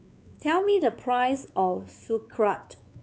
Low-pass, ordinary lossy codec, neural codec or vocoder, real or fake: none; none; codec, 16 kHz, 4 kbps, X-Codec, WavLM features, trained on Multilingual LibriSpeech; fake